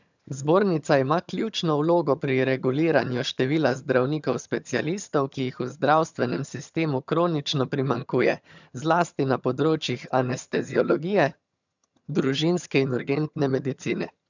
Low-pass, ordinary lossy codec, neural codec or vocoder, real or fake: 7.2 kHz; none; vocoder, 22.05 kHz, 80 mel bands, HiFi-GAN; fake